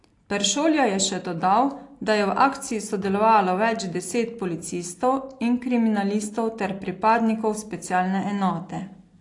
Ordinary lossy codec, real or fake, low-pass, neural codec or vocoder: AAC, 48 kbps; real; 10.8 kHz; none